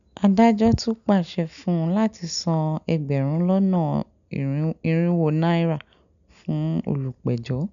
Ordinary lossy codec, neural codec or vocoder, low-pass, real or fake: none; none; 7.2 kHz; real